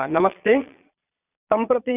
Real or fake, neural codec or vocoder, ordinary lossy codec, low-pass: fake; vocoder, 22.05 kHz, 80 mel bands, Vocos; none; 3.6 kHz